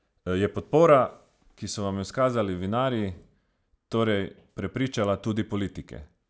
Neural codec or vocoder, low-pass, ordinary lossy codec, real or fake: none; none; none; real